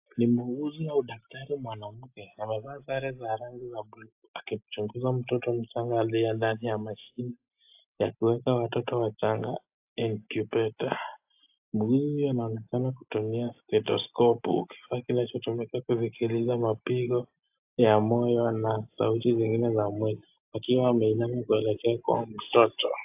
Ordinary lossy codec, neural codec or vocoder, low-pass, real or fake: AAC, 32 kbps; none; 3.6 kHz; real